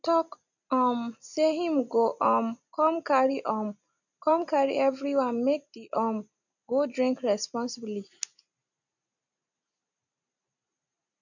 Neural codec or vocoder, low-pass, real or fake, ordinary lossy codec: none; 7.2 kHz; real; none